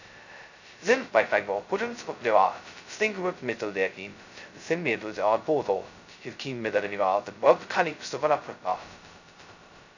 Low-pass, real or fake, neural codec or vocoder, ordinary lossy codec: 7.2 kHz; fake; codec, 16 kHz, 0.2 kbps, FocalCodec; none